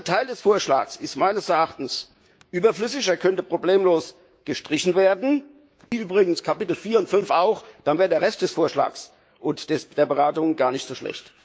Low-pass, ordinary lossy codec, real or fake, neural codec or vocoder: none; none; fake; codec, 16 kHz, 6 kbps, DAC